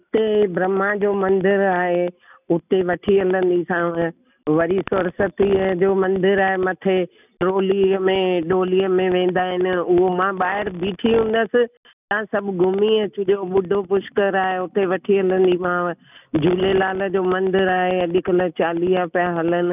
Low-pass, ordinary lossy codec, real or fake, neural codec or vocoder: 3.6 kHz; none; real; none